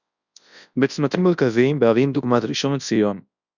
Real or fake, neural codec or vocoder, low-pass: fake; codec, 24 kHz, 0.9 kbps, WavTokenizer, large speech release; 7.2 kHz